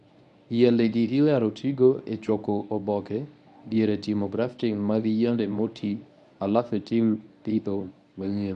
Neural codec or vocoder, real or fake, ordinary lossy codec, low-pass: codec, 24 kHz, 0.9 kbps, WavTokenizer, medium speech release version 1; fake; none; 10.8 kHz